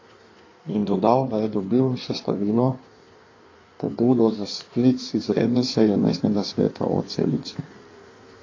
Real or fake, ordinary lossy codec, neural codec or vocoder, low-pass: fake; AAC, 32 kbps; codec, 16 kHz in and 24 kHz out, 1.1 kbps, FireRedTTS-2 codec; 7.2 kHz